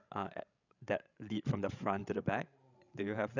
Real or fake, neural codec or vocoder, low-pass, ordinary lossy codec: fake; codec, 16 kHz, 16 kbps, FreqCodec, larger model; 7.2 kHz; none